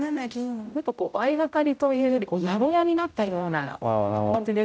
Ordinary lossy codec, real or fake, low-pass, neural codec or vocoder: none; fake; none; codec, 16 kHz, 0.5 kbps, X-Codec, HuBERT features, trained on general audio